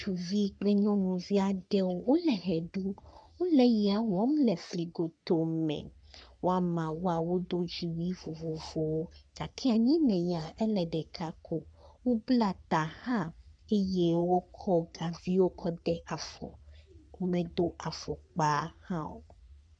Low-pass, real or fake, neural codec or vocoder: 9.9 kHz; fake; codec, 44.1 kHz, 3.4 kbps, Pupu-Codec